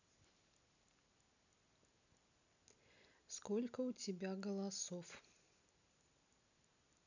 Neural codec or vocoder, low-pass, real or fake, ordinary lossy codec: none; 7.2 kHz; real; none